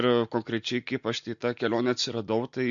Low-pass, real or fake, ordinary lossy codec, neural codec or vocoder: 7.2 kHz; real; MP3, 48 kbps; none